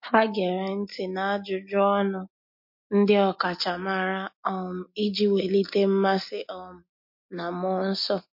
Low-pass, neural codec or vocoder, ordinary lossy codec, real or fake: 5.4 kHz; none; MP3, 32 kbps; real